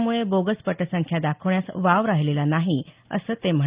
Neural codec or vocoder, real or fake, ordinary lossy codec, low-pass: none; real; Opus, 32 kbps; 3.6 kHz